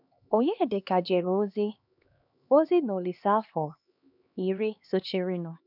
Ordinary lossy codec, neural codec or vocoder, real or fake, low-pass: none; codec, 16 kHz, 2 kbps, X-Codec, HuBERT features, trained on LibriSpeech; fake; 5.4 kHz